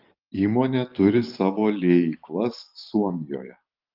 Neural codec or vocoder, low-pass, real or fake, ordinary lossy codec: vocoder, 44.1 kHz, 128 mel bands every 512 samples, BigVGAN v2; 5.4 kHz; fake; Opus, 32 kbps